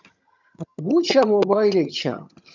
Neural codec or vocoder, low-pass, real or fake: vocoder, 22.05 kHz, 80 mel bands, HiFi-GAN; 7.2 kHz; fake